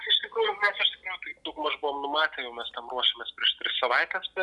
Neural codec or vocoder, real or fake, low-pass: none; real; 10.8 kHz